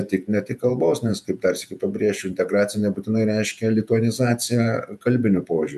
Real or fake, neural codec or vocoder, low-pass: fake; vocoder, 48 kHz, 128 mel bands, Vocos; 14.4 kHz